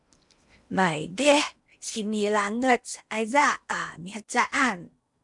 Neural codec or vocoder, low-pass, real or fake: codec, 16 kHz in and 24 kHz out, 0.8 kbps, FocalCodec, streaming, 65536 codes; 10.8 kHz; fake